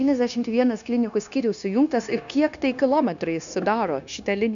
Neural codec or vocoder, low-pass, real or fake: codec, 16 kHz, 0.9 kbps, LongCat-Audio-Codec; 7.2 kHz; fake